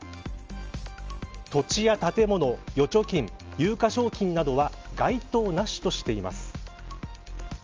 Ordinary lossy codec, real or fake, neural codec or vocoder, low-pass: Opus, 24 kbps; real; none; 7.2 kHz